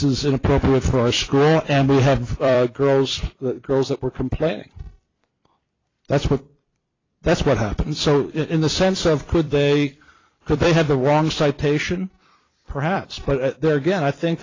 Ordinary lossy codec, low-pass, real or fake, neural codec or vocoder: AAC, 32 kbps; 7.2 kHz; fake; vocoder, 44.1 kHz, 128 mel bands every 256 samples, BigVGAN v2